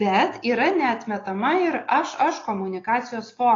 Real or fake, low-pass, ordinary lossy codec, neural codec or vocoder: real; 7.2 kHz; AAC, 32 kbps; none